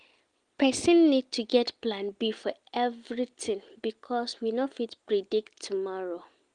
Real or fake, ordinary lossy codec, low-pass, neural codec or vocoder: real; Opus, 24 kbps; 9.9 kHz; none